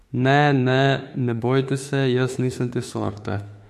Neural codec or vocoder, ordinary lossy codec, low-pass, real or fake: autoencoder, 48 kHz, 32 numbers a frame, DAC-VAE, trained on Japanese speech; MP3, 64 kbps; 14.4 kHz; fake